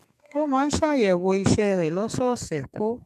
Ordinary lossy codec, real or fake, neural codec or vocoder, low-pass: MP3, 96 kbps; fake; codec, 32 kHz, 1.9 kbps, SNAC; 14.4 kHz